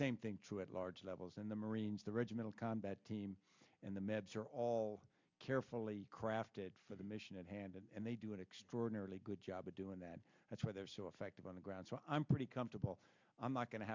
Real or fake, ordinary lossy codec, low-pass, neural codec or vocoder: real; MP3, 64 kbps; 7.2 kHz; none